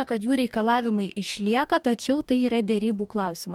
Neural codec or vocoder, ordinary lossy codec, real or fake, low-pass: codec, 44.1 kHz, 2.6 kbps, DAC; MP3, 96 kbps; fake; 19.8 kHz